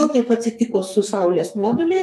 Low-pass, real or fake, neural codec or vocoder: 14.4 kHz; fake; codec, 32 kHz, 1.9 kbps, SNAC